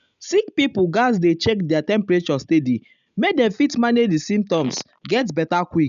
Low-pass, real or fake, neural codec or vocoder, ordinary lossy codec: 7.2 kHz; real; none; none